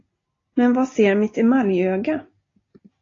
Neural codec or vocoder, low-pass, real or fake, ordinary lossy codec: none; 7.2 kHz; real; AAC, 32 kbps